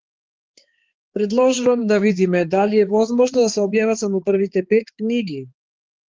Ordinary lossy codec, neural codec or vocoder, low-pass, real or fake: Opus, 16 kbps; codec, 16 kHz, 4 kbps, X-Codec, HuBERT features, trained on balanced general audio; 7.2 kHz; fake